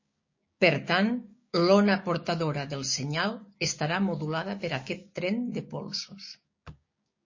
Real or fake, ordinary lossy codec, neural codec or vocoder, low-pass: fake; MP3, 32 kbps; codec, 16 kHz, 6 kbps, DAC; 7.2 kHz